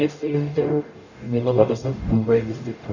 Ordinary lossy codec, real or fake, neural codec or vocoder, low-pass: none; fake; codec, 44.1 kHz, 0.9 kbps, DAC; 7.2 kHz